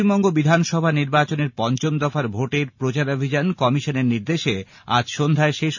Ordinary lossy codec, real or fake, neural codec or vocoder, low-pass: none; real; none; 7.2 kHz